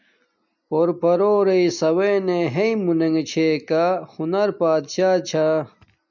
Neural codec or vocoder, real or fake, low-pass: none; real; 7.2 kHz